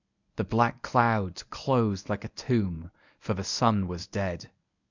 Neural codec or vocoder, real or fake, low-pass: codec, 24 kHz, 0.9 kbps, WavTokenizer, medium speech release version 1; fake; 7.2 kHz